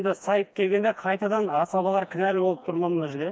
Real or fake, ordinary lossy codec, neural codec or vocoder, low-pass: fake; none; codec, 16 kHz, 2 kbps, FreqCodec, smaller model; none